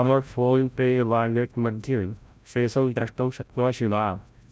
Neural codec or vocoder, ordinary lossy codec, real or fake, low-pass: codec, 16 kHz, 0.5 kbps, FreqCodec, larger model; none; fake; none